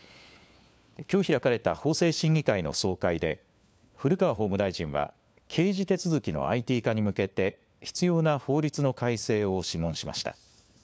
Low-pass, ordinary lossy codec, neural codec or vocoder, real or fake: none; none; codec, 16 kHz, 4 kbps, FunCodec, trained on LibriTTS, 50 frames a second; fake